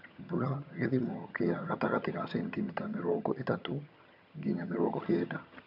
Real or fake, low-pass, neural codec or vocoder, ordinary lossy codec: fake; 5.4 kHz; vocoder, 22.05 kHz, 80 mel bands, HiFi-GAN; none